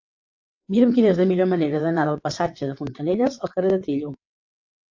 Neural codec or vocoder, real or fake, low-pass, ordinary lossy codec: vocoder, 22.05 kHz, 80 mel bands, WaveNeXt; fake; 7.2 kHz; AAC, 48 kbps